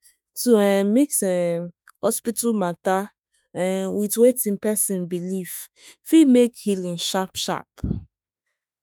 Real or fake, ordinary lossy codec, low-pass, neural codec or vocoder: fake; none; none; autoencoder, 48 kHz, 32 numbers a frame, DAC-VAE, trained on Japanese speech